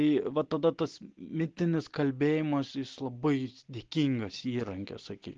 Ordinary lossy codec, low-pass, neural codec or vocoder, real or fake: Opus, 16 kbps; 7.2 kHz; none; real